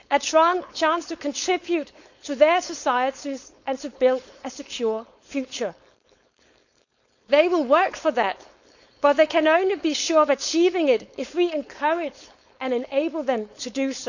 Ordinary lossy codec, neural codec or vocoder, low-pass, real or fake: none; codec, 16 kHz, 4.8 kbps, FACodec; 7.2 kHz; fake